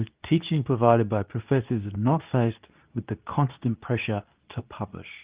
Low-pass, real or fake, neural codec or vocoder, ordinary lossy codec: 3.6 kHz; fake; codec, 24 kHz, 0.9 kbps, WavTokenizer, medium speech release version 2; Opus, 32 kbps